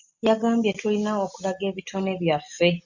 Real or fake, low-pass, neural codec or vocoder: real; 7.2 kHz; none